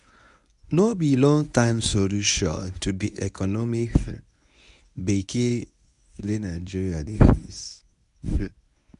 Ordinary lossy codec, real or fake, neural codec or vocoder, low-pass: none; fake; codec, 24 kHz, 0.9 kbps, WavTokenizer, medium speech release version 1; 10.8 kHz